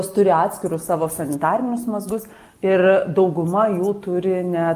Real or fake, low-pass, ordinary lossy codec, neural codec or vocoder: real; 14.4 kHz; Opus, 32 kbps; none